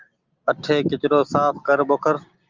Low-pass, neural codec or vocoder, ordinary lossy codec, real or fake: 7.2 kHz; none; Opus, 32 kbps; real